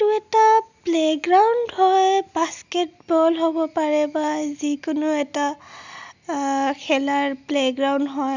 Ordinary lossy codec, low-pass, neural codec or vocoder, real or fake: none; 7.2 kHz; none; real